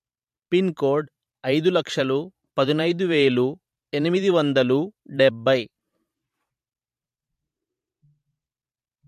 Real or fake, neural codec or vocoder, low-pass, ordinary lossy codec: fake; vocoder, 44.1 kHz, 128 mel bands every 512 samples, BigVGAN v2; 14.4 kHz; MP3, 64 kbps